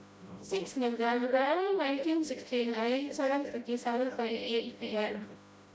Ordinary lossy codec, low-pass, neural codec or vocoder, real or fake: none; none; codec, 16 kHz, 0.5 kbps, FreqCodec, smaller model; fake